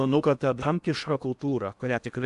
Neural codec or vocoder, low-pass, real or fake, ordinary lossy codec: codec, 16 kHz in and 24 kHz out, 0.8 kbps, FocalCodec, streaming, 65536 codes; 10.8 kHz; fake; MP3, 96 kbps